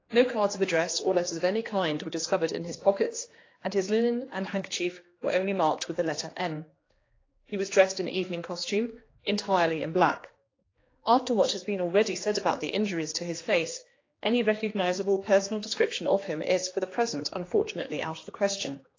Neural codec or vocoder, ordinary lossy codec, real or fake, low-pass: codec, 16 kHz, 2 kbps, X-Codec, HuBERT features, trained on general audio; AAC, 32 kbps; fake; 7.2 kHz